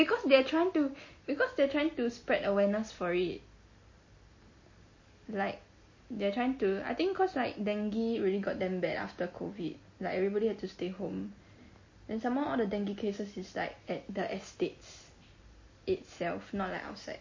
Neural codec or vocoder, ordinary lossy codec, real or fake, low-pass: none; MP3, 32 kbps; real; 7.2 kHz